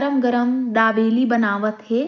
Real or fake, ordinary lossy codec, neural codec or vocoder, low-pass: real; none; none; 7.2 kHz